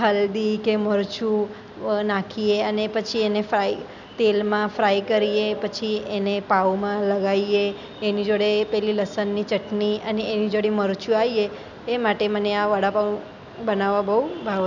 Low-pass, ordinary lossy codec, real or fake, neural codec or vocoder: 7.2 kHz; none; real; none